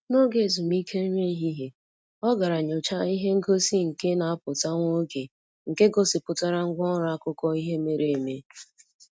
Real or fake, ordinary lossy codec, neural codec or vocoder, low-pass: real; none; none; none